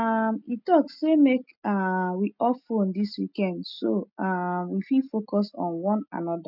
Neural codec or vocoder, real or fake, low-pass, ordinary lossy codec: none; real; 5.4 kHz; none